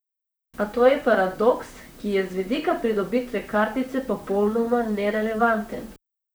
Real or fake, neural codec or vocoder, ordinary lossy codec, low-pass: fake; vocoder, 44.1 kHz, 128 mel bands, Pupu-Vocoder; none; none